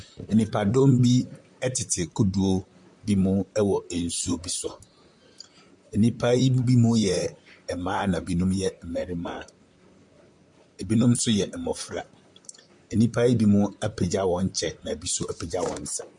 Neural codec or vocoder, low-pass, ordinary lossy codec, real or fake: vocoder, 44.1 kHz, 128 mel bands, Pupu-Vocoder; 10.8 kHz; MP3, 64 kbps; fake